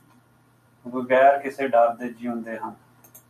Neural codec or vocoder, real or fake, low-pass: none; real; 14.4 kHz